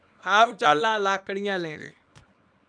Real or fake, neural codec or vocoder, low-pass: fake; codec, 24 kHz, 0.9 kbps, WavTokenizer, small release; 9.9 kHz